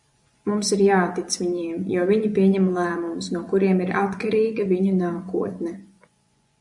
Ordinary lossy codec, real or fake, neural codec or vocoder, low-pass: MP3, 64 kbps; fake; vocoder, 24 kHz, 100 mel bands, Vocos; 10.8 kHz